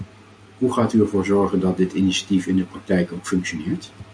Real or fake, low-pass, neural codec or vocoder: real; 9.9 kHz; none